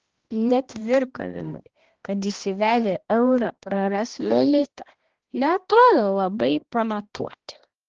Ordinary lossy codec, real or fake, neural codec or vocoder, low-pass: Opus, 16 kbps; fake; codec, 16 kHz, 1 kbps, X-Codec, HuBERT features, trained on balanced general audio; 7.2 kHz